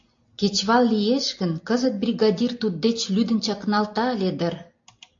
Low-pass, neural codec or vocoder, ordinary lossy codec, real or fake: 7.2 kHz; none; AAC, 48 kbps; real